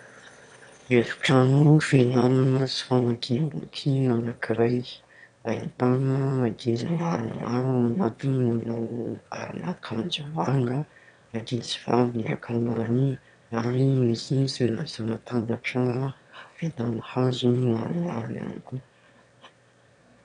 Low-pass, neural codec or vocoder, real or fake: 9.9 kHz; autoencoder, 22.05 kHz, a latent of 192 numbers a frame, VITS, trained on one speaker; fake